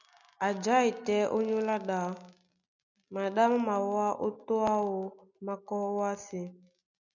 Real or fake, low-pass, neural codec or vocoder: real; 7.2 kHz; none